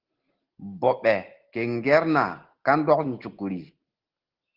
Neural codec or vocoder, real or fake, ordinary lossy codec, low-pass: none; real; Opus, 16 kbps; 5.4 kHz